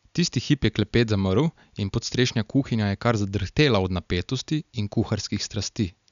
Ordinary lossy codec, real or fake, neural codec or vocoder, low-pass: none; real; none; 7.2 kHz